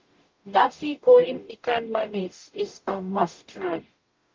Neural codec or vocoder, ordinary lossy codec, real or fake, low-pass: codec, 44.1 kHz, 0.9 kbps, DAC; Opus, 24 kbps; fake; 7.2 kHz